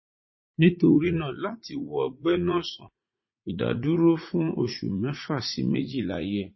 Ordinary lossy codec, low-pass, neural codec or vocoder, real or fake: MP3, 24 kbps; 7.2 kHz; vocoder, 44.1 kHz, 80 mel bands, Vocos; fake